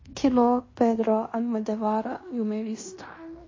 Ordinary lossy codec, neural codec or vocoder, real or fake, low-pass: MP3, 32 kbps; codec, 16 kHz in and 24 kHz out, 0.9 kbps, LongCat-Audio-Codec, fine tuned four codebook decoder; fake; 7.2 kHz